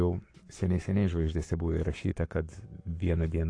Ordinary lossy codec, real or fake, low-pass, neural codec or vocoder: AAC, 48 kbps; fake; 9.9 kHz; codec, 44.1 kHz, 7.8 kbps, Pupu-Codec